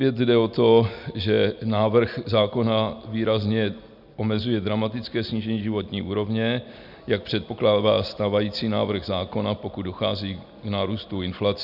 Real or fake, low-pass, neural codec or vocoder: real; 5.4 kHz; none